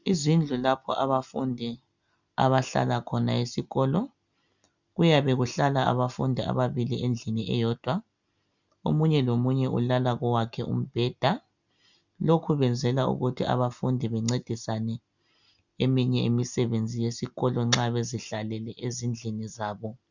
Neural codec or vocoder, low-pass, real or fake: none; 7.2 kHz; real